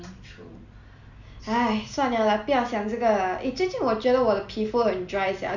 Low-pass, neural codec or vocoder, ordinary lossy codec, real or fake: 7.2 kHz; none; none; real